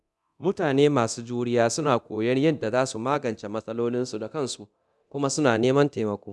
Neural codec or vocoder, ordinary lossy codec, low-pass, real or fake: codec, 24 kHz, 0.9 kbps, DualCodec; none; none; fake